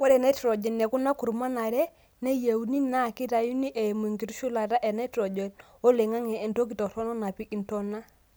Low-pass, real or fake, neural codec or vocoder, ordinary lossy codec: none; real; none; none